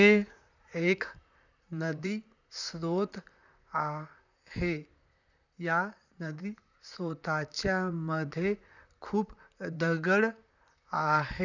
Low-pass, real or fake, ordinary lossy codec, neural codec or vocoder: 7.2 kHz; fake; none; vocoder, 44.1 kHz, 128 mel bands, Pupu-Vocoder